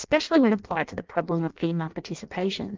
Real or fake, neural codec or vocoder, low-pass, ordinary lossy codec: fake; codec, 16 kHz in and 24 kHz out, 0.6 kbps, FireRedTTS-2 codec; 7.2 kHz; Opus, 32 kbps